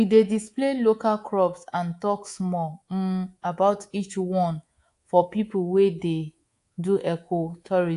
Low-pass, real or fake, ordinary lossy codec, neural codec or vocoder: 10.8 kHz; fake; AAC, 48 kbps; codec, 24 kHz, 3.1 kbps, DualCodec